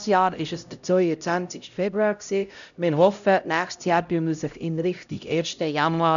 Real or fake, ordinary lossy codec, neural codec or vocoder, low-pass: fake; none; codec, 16 kHz, 0.5 kbps, X-Codec, HuBERT features, trained on LibriSpeech; 7.2 kHz